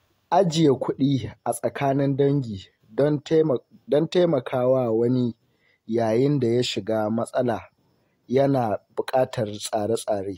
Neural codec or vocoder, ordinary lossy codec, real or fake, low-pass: none; AAC, 48 kbps; real; 19.8 kHz